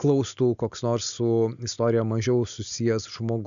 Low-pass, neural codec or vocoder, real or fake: 7.2 kHz; none; real